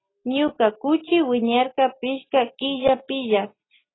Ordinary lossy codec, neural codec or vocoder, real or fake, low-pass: AAC, 16 kbps; none; real; 7.2 kHz